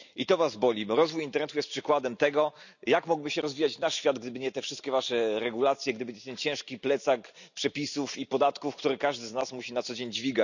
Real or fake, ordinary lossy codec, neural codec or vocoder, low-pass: real; none; none; 7.2 kHz